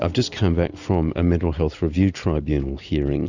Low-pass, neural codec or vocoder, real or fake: 7.2 kHz; none; real